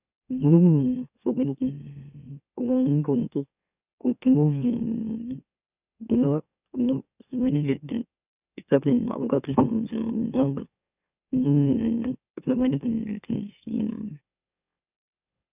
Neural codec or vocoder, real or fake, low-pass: autoencoder, 44.1 kHz, a latent of 192 numbers a frame, MeloTTS; fake; 3.6 kHz